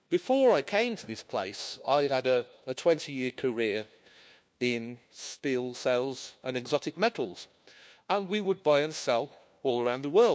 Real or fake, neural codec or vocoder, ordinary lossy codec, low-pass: fake; codec, 16 kHz, 1 kbps, FunCodec, trained on LibriTTS, 50 frames a second; none; none